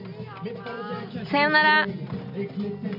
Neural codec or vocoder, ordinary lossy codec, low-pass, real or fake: none; none; 5.4 kHz; real